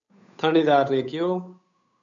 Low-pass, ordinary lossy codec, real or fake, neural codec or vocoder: 7.2 kHz; MP3, 64 kbps; fake; codec, 16 kHz, 8 kbps, FunCodec, trained on Chinese and English, 25 frames a second